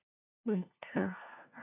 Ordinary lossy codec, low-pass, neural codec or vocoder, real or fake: AAC, 32 kbps; 3.6 kHz; codec, 16 kHz, 1 kbps, FunCodec, trained on LibriTTS, 50 frames a second; fake